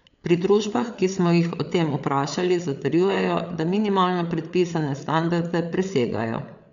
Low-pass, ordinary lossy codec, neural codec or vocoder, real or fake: 7.2 kHz; none; codec, 16 kHz, 8 kbps, FreqCodec, larger model; fake